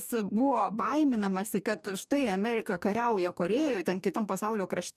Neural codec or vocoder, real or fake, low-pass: codec, 44.1 kHz, 2.6 kbps, DAC; fake; 14.4 kHz